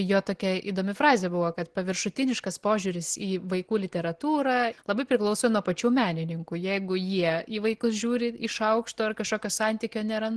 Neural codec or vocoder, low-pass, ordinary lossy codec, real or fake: none; 10.8 kHz; Opus, 16 kbps; real